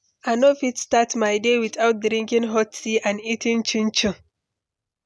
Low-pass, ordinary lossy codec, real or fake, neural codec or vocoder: none; none; real; none